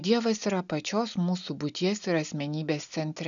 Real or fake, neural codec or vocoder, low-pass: real; none; 7.2 kHz